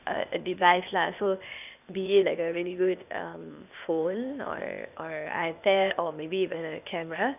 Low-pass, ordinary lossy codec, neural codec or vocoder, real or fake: 3.6 kHz; none; codec, 16 kHz, 0.8 kbps, ZipCodec; fake